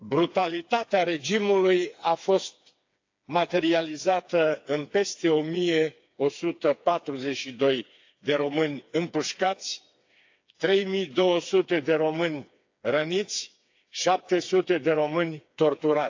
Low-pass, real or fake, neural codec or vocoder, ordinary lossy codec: 7.2 kHz; fake; codec, 16 kHz, 4 kbps, FreqCodec, smaller model; AAC, 48 kbps